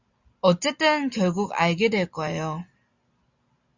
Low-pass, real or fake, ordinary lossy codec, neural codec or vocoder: 7.2 kHz; real; Opus, 64 kbps; none